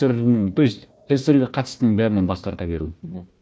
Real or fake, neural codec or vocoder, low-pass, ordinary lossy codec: fake; codec, 16 kHz, 1 kbps, FunCodec, trained on Chinese and English, 50 frames a second; none; none